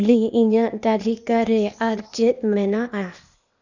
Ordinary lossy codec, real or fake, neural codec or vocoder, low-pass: none; fake; codec, 16 kHz, 0.8 kbps, ZipCodec; 7.2 kHz